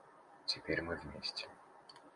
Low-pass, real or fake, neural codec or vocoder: 10.8 kHz; real; none